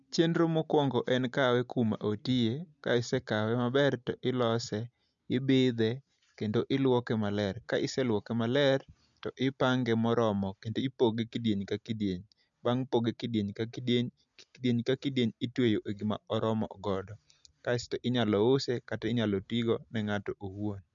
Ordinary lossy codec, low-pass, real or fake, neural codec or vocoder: MP3, 96 kbps; 7.2 kHz; real; none